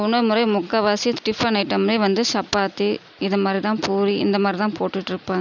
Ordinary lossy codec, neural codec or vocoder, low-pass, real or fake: none; none; 7.2 kHz; real